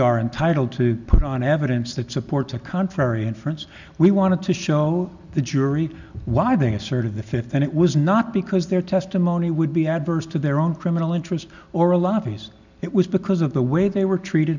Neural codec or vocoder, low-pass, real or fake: none; 7.2 kHz; real